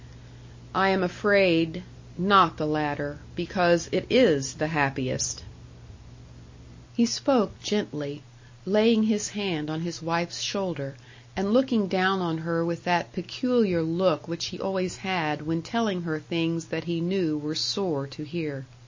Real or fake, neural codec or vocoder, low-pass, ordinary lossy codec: real; none; 7.2 kHz; MP3, 32 kbps